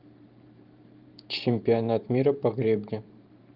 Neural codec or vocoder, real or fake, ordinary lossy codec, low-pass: vocoder, 44.1 kHz, 128 mel bands every 512 samples, BigVGAN v2; fake; Opus, 24 kbps; 5.4 kHz